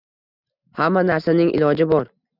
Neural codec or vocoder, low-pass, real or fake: none; 5.4 kHz; real